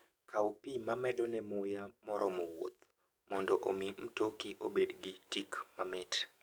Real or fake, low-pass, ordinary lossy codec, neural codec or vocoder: fake; none; none; codec, 44.1 kHz, 7.8 kbps, DAC